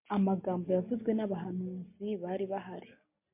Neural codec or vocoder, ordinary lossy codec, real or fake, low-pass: none; MP3, 24 kbps; real; 3.6 kHz